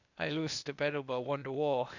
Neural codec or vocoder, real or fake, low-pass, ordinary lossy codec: codec, 16 kHz, 0.8 kbps, ZipCodec; fake; 7.2 kHz; none